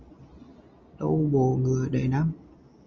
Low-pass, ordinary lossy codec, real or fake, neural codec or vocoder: 7.2 kHz; Opus, 32 kbps; real; none